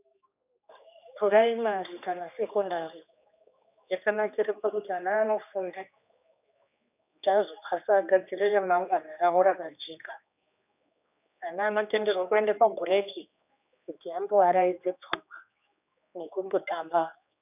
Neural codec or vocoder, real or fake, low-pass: codec, 16 kHz, 2 kbps, X-Codec, HuBERT features, trained on general audio; fake; 3.6 kHz